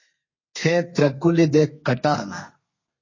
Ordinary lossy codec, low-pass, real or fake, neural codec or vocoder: MP3, 32 kbps; 7.2 kHz; fake; codec, 32 kHz, 1.9 kbps, SNAC